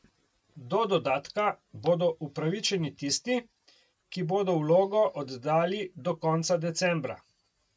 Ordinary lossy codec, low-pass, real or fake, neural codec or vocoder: none; none; real; none